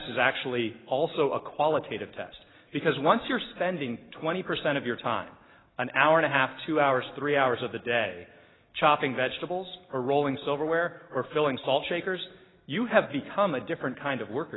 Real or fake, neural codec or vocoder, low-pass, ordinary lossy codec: real; none; 7.2 kHz; AAC, 16 kbps